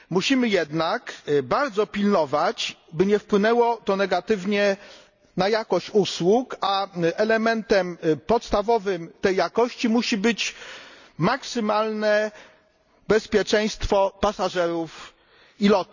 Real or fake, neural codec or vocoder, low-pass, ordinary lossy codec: real; none; 7.2 kHz; none